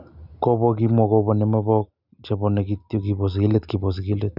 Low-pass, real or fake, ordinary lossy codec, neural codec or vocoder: 5.4 kHz; real; none; none